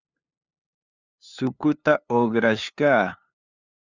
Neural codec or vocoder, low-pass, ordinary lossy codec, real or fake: codec, 16 kHz, 2 kbps, FunCodec, trained on LibriTTS, 25 frames a second; 7.2 kHz; Opus, 64 kbps; fake